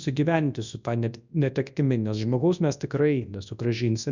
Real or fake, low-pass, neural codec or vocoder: fake; 7.2 kHz; codec, 24 kHz, 0.9 kbps, WavTokenizer, large speech release